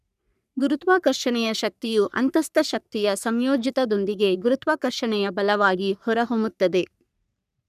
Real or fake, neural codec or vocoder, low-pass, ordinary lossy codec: fake; codec, 44.1 kHz, 3.4 kbps, Pupu-Codec; 14.4 kHz; none